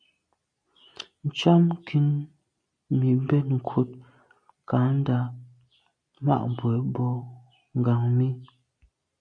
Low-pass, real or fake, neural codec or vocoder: 9.9 kHz; real; none